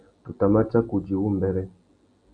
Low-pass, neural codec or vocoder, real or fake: 9.9 kHz; none; real